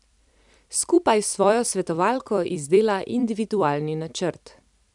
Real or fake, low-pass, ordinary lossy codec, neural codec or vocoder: fake; 10.8 kHz; none; vocoder, 44.1 kHz, 128 mel bands every 256 samples, BigVGAN v2